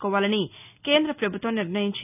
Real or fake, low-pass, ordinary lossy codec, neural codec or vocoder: real; 3.6 kHz; none; none